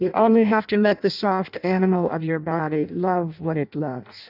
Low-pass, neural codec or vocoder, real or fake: 5.4 kHz; codec, 16 kHz in and 24 kHz out, 0.6 kbps, FireRedTTS-2 codec; fake